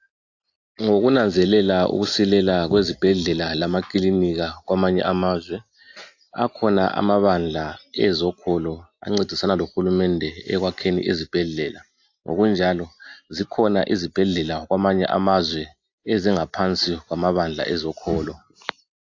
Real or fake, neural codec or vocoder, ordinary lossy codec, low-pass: real; none; AAC, 48 kbps; 7.2 kHz